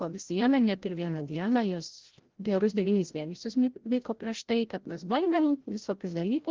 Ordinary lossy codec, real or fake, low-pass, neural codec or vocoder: Opus, 16 kbps; fake; 7.2 kHz; codec, 16 kHz, 0.5 kbps, FreqCodec, larger model